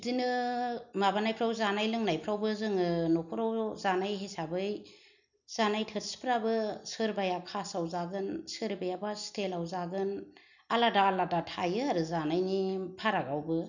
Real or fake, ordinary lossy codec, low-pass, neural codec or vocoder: real; none; 7.2 kHz; none